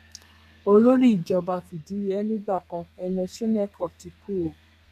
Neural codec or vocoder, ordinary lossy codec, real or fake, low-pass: codec, 32 kHz, 1.9 kbps, SNAC; none; fake; 14.4 kHz